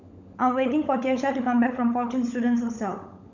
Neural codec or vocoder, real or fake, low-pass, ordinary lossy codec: codec, 16 kHz, 16 kbps, FunCodec, trained on LibriTTS, 50 frames a second; fake; 7.2 kHz; none